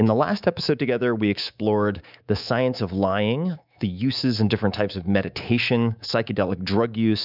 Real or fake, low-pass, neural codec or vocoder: fake; 5.4 kHz; codec, 24 kHz, 3.1 kbps, DualCodec